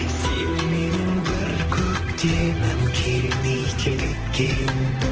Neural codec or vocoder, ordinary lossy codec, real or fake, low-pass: vocoder, 44.1 kHz, 128 mel bands, Pupu-Vocoder; Opus, 16 kbps; fake; 7.2 kHz